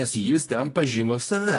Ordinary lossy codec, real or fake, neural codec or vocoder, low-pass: AAC, 48 kbps; fake; codec, 24 kHz, 0.9 kbps, WavTokenizer, medium music audio release; 10.8 kHz